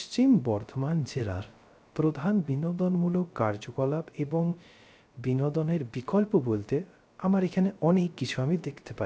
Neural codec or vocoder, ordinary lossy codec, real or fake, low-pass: codec, 16 kHz, 0.3 kbps, FocalCodec; none; fake; none